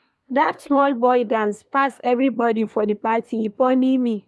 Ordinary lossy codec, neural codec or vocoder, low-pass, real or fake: none; codec, 24 kHz, 1 kbps, SNAC; none; fake